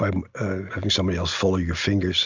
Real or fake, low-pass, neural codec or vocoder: real; 7.2 kHz; none